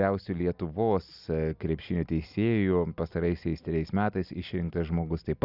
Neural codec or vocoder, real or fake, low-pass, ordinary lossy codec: none; real; 5.4 kHz; Opus, 64 kbps